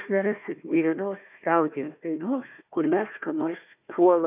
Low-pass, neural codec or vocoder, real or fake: 3.6 kHz; codec, 16 kHz, 1 kbps, FunCodec, trained on Chinese and English, 50 frames a second; fake